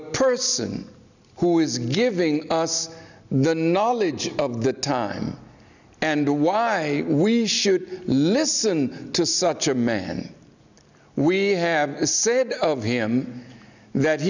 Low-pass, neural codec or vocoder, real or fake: 7.2 kHz; none; real